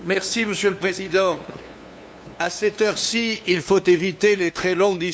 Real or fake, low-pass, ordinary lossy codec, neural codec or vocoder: fake; none; none; codec, 16 kHz, 2 kbps, FunCodec, trained on LibriTTS, 25 frames a second